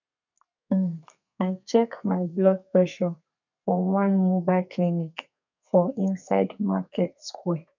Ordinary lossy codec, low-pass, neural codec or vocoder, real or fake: none; 7.2 kHz; codec, 32 kHz, 1.9 kbps, SNAC; fake